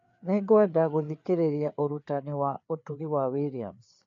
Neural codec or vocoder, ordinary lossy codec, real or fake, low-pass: codec, 16 kHz, 4 kbps, FreqCodec, larger model; none; fake; 7.2 kHz